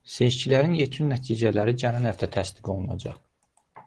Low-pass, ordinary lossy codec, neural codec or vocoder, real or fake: 10.8 kHz; Opus, 16 kbps; none; real